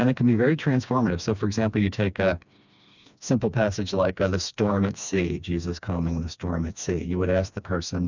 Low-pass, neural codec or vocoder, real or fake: 7.2 kHz; codec, 16 kHz, 2 kbps, FreqCodec, smaller model; fake